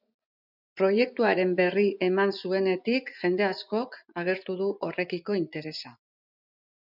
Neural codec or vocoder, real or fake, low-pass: vocoder, 24 kHz, 100 mel bands, Vocos; fake; 5.4 kHz